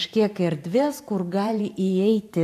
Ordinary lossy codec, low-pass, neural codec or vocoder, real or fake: AAC, 64 kbps; 14.4 kHz; vocoder, 44.1 kHz, 128 mel bands every 512 samples, BigVGAN v2; fake